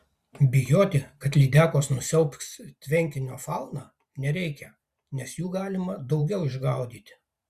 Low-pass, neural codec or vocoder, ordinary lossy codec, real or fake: 14.4 kHz; vocoder, 44.1 kHz, 128 mel bands every 512 samples, BigVGAN v2; Opus, 64 kbps; fake